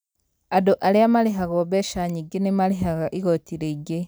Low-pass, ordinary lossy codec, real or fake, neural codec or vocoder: none; none; real; none